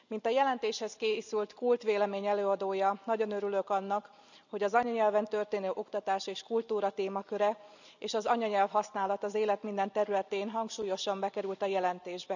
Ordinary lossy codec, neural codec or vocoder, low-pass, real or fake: none; none; 7.2 kHz; real